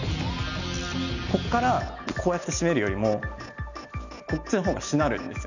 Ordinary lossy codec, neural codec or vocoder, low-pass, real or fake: none; none; 7.2 kHz; real